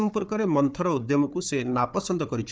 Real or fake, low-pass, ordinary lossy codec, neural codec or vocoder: fake; none; none; codec, 16 kHz, 4 kbps, FunCodec, trained on Chinese and English, 50 frames a second